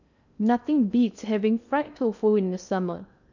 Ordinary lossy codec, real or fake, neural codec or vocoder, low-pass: none; fake; codec, 16 kHz in and 24 kHz out, 0.6 kbps, FocalCodec, streaming, 2048 codes; 7.2 kHz